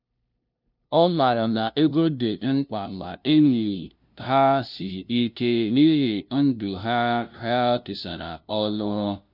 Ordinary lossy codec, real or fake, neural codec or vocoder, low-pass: none; fake; codec, 16 kHz, 0.5 kbps, FunCodec, trained on LibriTTS, 25 frames a second; 5.4 kHz